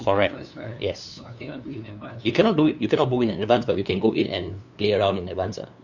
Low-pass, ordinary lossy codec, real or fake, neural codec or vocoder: 7.2 kHz; none; fake; codec, 16 kHz, 2 kbps, FunCodec, trained on LibriTTS, 25 frames a second